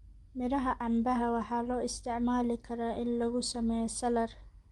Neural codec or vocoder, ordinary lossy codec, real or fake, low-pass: none; Opus, 24 kbps; real; 10.8 kHz